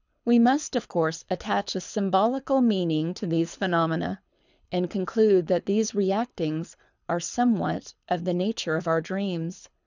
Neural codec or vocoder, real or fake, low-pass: codec, 24 kHz, 6 kbps, HILCodec; fake; 7.2 kHz